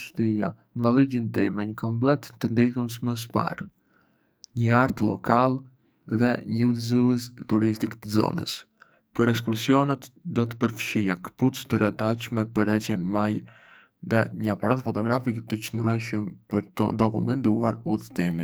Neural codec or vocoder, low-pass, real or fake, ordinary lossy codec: codec, 44.1 kHz, 2.6 kbps, SNAC; none; fake; none